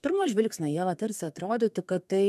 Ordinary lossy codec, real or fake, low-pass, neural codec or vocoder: MP3, 96 kbps; fake; 14.4 kHz; codec, 32 kHz, 1.9 kbps, SNAC